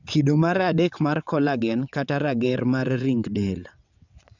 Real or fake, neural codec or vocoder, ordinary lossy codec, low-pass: fake; vocoder, 22.05 kHz, 80 mel bands, WaveNeXt; none; 7.2 kHz